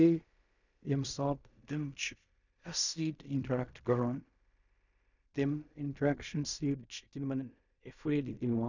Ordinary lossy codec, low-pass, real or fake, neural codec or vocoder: none; 7.2 kHz; fake; codec, 16 kHz in and 24 kHz out, 0.4 kbps, LongCat-Audio-Codec, fine tuned four codebook decoder